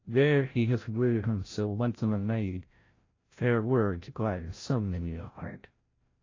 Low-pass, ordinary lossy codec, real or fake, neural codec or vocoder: 7.2 kHz; AAC, 32 kbps; fake; codec, 16 kHz, 0.5 kbps, FreqCodec, larger model